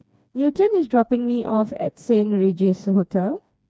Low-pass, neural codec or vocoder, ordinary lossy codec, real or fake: none; codec, 16 kHz, 2 kbps, FreqCodec, smaller model; none; fake